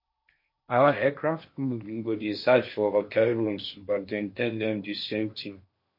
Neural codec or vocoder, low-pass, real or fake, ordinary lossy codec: codec, 16 kHz in and 24 kHz out, 0.8 kbps, FocalCodec, streaming, 65536 codes; 5.4 kHz; fake; MP3, 32 kbps